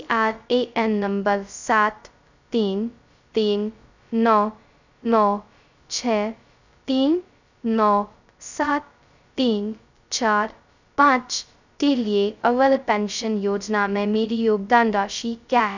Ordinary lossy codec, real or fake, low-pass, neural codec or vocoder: none; fake; 7.2 kHz; codec, 16 kHz, 0.2 kbps, FocalCodec